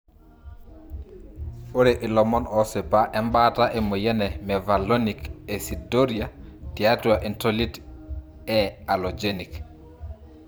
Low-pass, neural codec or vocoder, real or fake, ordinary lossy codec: none; vocoder, 44.1 kHz, 128 mel bands every 512 samples, BigVGAN v2; fake; none